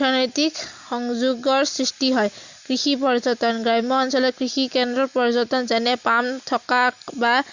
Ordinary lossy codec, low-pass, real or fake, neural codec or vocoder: Opus, 64 kbps; 7.2 kHz; real; none